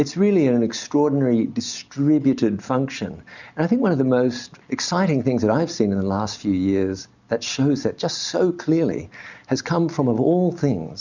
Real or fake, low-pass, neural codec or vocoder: real; 7.2 kHz; none